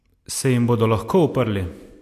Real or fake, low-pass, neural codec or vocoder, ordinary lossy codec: real; 14.4 kHz; none; AAC, 64 kbps